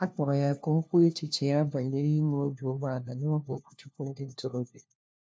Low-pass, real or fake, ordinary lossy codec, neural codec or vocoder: none; fake; none; codec, 16 kHz, 1 kbps, FunCodec, trained on LibriTTS, 50 frames a second